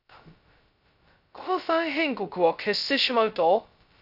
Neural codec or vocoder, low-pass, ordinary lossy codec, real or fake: codec, 16 kHz, 0.2 kbps, FocalCodec; 5.4 kHz; none; fake